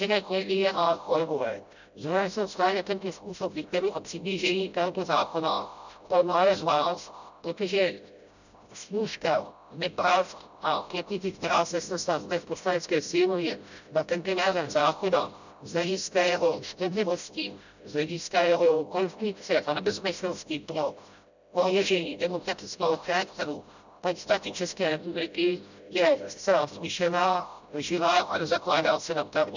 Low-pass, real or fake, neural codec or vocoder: 7.2 kHz; fake; codec, 16 kHz, 0.5 kbps, FreqCodec, smaller model